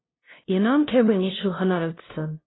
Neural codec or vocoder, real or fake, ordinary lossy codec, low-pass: codec, 16 kHz, 0.5 kbps, FunCodec, trained on LibriTTS, 25 frames a second; fake; AAC, 16 kbps; 7.2 kHz